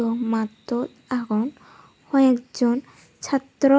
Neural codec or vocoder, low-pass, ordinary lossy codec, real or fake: none; none; none; real